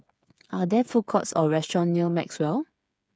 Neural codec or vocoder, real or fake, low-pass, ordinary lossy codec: codec, 16 kHz, 8 kbps, FreqCodec, smaller model; fake; none; none